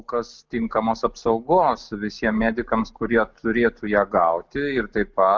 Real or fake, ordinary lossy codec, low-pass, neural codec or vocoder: real; Opus, 24 kbps; 7.2 kHz; none